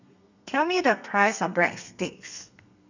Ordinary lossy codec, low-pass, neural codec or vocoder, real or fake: none; 7.2 kHz; codec, 32 kHz, 1.9 kbps, SNAC; fake